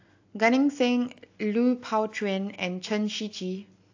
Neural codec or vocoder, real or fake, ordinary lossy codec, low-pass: none; real; AAC, 48 kbps; 7.2 kHz